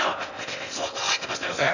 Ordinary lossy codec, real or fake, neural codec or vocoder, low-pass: none; fake; codec, 16 kHz in and 24 kHz out, 0.6 kbps, FocalCodec, streaming, 4096 codes; 7.2 kHz